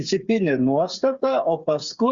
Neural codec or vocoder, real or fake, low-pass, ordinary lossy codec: codec, 16 kHz, 8 kbps, FreqCodec, smaller model; fake; 7.2 kHz; Opus, 64 kbps